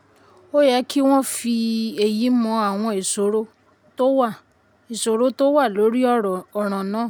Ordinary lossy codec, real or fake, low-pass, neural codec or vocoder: none; real; none; none